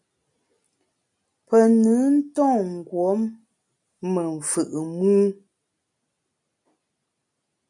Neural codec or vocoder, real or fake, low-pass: none; real; 10.8 kHz